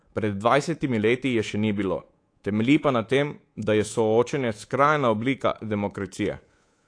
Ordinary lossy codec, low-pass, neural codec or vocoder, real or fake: AAC, 48 kbps; 9.9 kHz; codec, 24 kHz, 3.1 kbps, DualCodec; fake